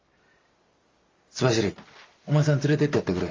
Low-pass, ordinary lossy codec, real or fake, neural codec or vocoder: 7.2 kHz; Opus, 32 kbps; real; none